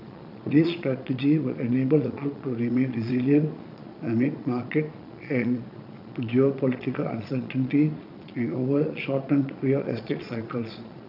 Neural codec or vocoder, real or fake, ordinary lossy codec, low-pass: vocoder, 22.05 kHz, 80 mel bands, Vocos; fake; none; 5.4 kHz